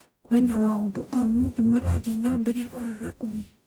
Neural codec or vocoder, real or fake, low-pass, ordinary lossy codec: codec, 44.1 kHz, 0.9 kbps, DAC; fake; none; none